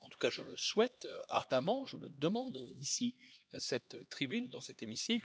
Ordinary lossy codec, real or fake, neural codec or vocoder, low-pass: none; fake; codec, 16 kHz, 2 kbps, X-Codec, HuBERT features, trained on LibriSpeech; none